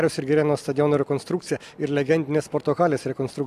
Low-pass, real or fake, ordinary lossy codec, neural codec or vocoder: 14.4 kHz; real; AAC, 96 kbps; none